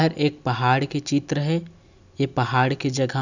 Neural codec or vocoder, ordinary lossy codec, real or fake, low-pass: none; none; real; 7.2 kHz